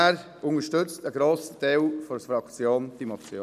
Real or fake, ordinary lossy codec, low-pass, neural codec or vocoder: real; none; 14.4 kHz; none